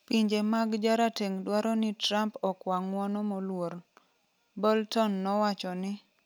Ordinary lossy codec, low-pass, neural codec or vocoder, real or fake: none; none; none; real